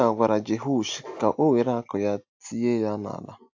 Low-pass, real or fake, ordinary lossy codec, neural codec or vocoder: 7.2 kHz; real; AAC, 48 kbps; none